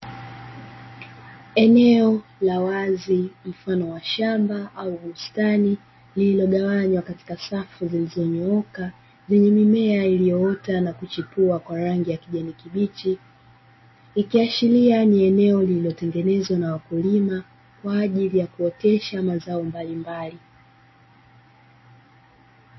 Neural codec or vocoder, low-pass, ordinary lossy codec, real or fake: none; 7.2 kHz; MP3, 24 kbps; real